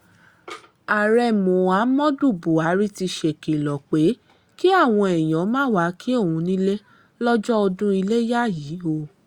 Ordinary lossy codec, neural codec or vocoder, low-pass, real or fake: Opus, 64 kbps; none; 19.8 kHz; real